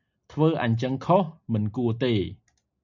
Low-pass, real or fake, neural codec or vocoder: 7.2 kHz; real; none